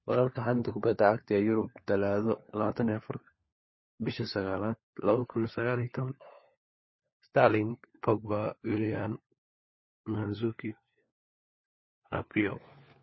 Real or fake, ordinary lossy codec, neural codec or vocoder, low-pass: fake; MP3, 24 kbps; codec, 16 kHz, 4 kbps, FunCodec, trained on LibriTTS, 50 frames a second; 7.2 kHz